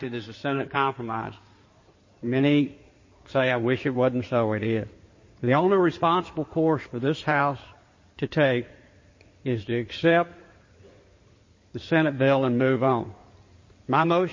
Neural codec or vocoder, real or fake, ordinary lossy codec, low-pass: codec, 16 kHz in and 24 kHz out, 2.2 kbps, FireRedTTS-2 codec; fake; MP3, 32 kbps; 7.2 kHz